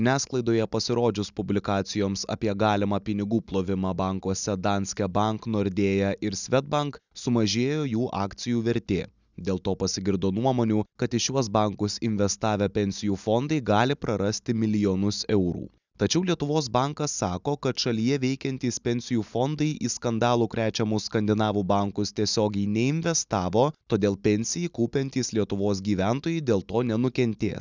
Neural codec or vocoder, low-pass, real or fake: none; 7.2 kHz; real